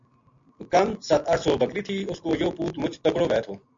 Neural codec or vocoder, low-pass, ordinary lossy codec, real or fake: none; 7.2 kHz; MP3, 48 kbps; real